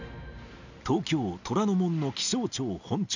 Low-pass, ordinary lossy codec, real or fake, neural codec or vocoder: 7.2 kHz; none; real; none